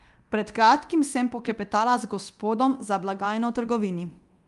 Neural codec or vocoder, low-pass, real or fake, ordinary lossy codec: codec, 24 kHz, 0.9 kbps, DualCodec; 10.8 kHz; fake; Opus, 32 kbps